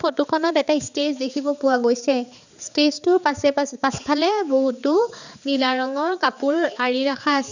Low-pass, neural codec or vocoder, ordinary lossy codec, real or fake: 7.2 kHz; codec, 16 kHz, 4 kbps, X-Codec, HuBERT features, trained on balanced general audio; none; fake